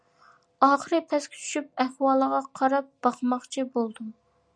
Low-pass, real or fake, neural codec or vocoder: 9.9 kHz; real; none